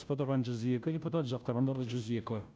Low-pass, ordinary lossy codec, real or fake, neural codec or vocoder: none; none; fake; codec, 16 kHz, 0.5 kbps, FunCodec, trained on Chinese and English, 25 frames a second